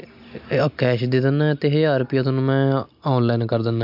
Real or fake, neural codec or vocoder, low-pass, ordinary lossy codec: real; none; 5.4 kHz; MP3, 48 kbps